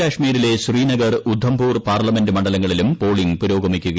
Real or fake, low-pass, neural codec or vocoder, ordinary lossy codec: real; none; none; none